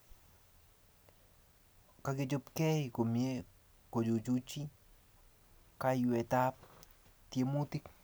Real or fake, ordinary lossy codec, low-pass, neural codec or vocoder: real; none; none; none